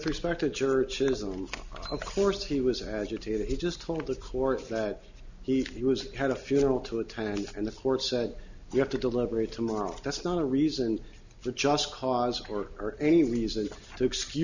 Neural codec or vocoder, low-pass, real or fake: none; 7.2 kHz; real